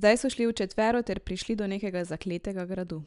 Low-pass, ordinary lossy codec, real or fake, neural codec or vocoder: 10.8 kHz; none; real; none